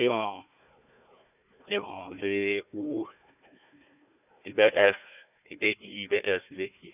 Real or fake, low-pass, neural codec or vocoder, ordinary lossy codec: fake; 3.6 kHz; codec, 16 kHz, 1 kbps, FunCodec, trained on Chinese and English, 50 frames a second; none